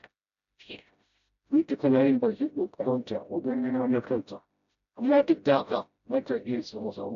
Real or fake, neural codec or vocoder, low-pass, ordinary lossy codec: fake; codec, 16 kHz, 0.5 kbps, FreqCodec, smaller model; 7.2 kHz; MP3, 96 kbps